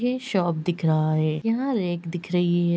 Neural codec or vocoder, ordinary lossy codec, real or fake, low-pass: none; none; real; none